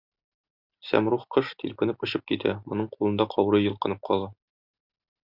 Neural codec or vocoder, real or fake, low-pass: none; real; 5.4 kHz